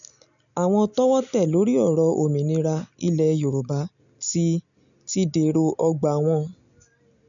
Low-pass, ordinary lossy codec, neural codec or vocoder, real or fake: 7.2 kHz; none; none; real